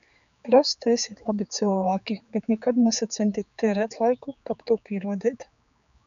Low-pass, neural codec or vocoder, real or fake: 7.2 kHz; codec, 16 kHz, 4 kbps, X-Codec, HuBERT features, trained on general audio; fake